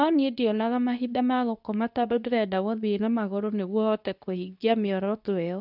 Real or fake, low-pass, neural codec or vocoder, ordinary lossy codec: fake; 5.4 kHz; codec, 24 kHz, 0.9 kbps, WavTokenizer, medium speech release version 2; none